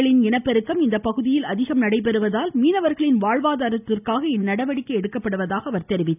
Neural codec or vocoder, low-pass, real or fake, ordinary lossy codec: none; 3.6 kHz; real; none